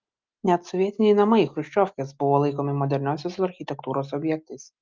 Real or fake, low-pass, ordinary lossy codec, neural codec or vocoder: real; 7.2 kHz; Opus, 32 kbps; none